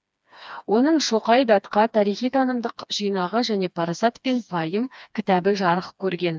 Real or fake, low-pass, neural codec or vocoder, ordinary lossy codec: fake; none; codec, 16 kHz, 2 kbps, FreqCodec, smaller model; none